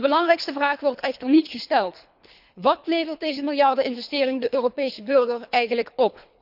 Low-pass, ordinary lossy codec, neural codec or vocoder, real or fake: 5.4 kHz; none; codec, 24 kHz, 3 kbps, HILCodec; fake